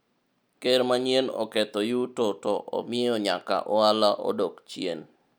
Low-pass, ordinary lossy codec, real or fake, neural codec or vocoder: none; none; real; none